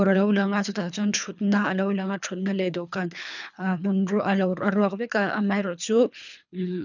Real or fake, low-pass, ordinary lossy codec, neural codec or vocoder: fake; 7.2 kHz; none; codec, 24 kHz, 3 kbps, HILCodec